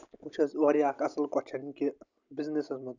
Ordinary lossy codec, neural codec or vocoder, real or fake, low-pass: none; none; real; 7.2 kHz